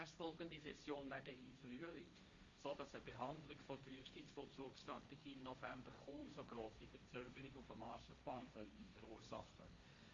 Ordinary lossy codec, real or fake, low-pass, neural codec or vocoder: Opus, 64 kbps; fake; 7.2 kHz; codec, 16 kHz, 1.1 kbps, Voila-Tokenizer